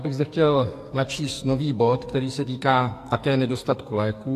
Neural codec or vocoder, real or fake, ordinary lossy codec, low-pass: codec, 44.1 kHz, 2.6 kbps, SNAC; fake; AAC, 64 kbps; 14.4 kHz